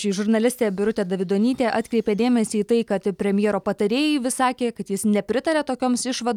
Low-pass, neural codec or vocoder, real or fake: 19.8 kHz; none; real